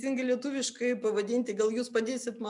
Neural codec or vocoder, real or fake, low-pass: none; real; 10.8 kHz